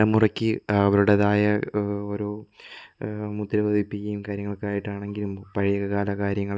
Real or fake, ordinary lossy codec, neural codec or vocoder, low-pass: real; none; none; none